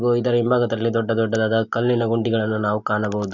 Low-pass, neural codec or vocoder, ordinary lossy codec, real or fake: 7.2 kHz; none; none; real